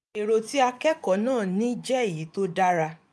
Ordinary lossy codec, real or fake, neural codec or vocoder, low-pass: none; fake; vocoder, 24 kHz, 100 mel bands, Vocos; none